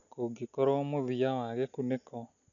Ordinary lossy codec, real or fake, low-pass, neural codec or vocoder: none; real; 7.2 kHz; none